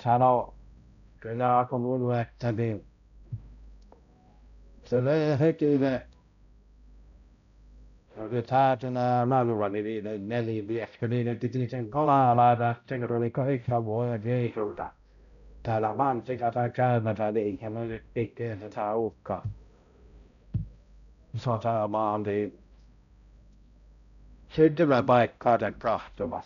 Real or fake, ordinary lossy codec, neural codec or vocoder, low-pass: fake; none; codec, 16 kHz, 0.5 kbps, X-Codec, HuBERT features, trained on balanced general audio; 7.2 kHz